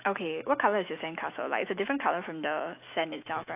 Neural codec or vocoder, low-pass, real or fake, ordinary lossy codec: autoencoder, 48 kHz, 128 numbers a frame, DAC-VAE, trained on Japanese speech; 3.6 kHz; fake; AAC, 32 kbps